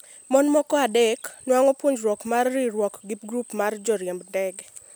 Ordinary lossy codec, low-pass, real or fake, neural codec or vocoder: none; none; real; none